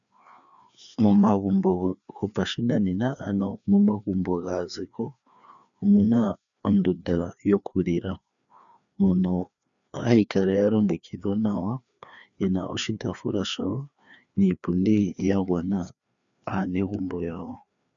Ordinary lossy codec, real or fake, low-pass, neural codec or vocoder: MP3, 96 kbps; fake; 7.2 kHz; codec, 16 kHz, 2 kbps, FreqCodec, larger model